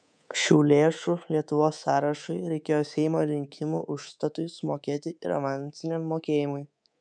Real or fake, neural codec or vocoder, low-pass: fake; codec, 24 kHz, 3.1 kbps, DualCodec; 9.9 kHz